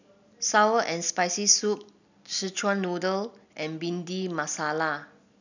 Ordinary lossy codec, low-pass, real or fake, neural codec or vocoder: none; 7.2 kHz; real; none